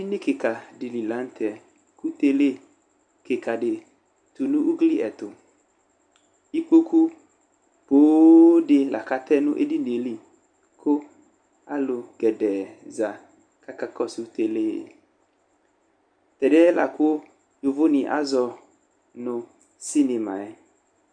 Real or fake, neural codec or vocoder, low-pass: real; none; 9.9 kHz